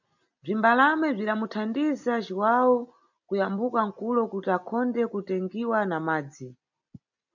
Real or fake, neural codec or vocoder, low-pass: real; none; 7.2 kHz